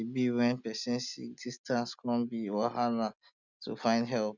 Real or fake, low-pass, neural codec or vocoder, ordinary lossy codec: real; 7.2 kHz; none; none